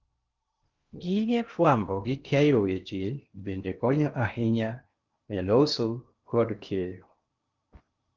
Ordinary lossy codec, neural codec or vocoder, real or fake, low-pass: Opus, 32 kbps; codec, 16 kHz in and 24 kHz out, 0.8 kbps, FocalCodec, streaming, 65536 codes; fake; 7.2 kHz